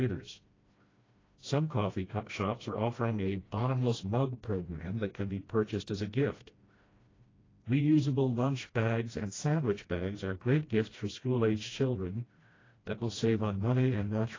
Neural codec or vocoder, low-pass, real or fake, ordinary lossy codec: codec, 16 kHz, 1 kbps, FreqCodec, smaller model; 7.2 kHz; fake; AAC, 32 kbps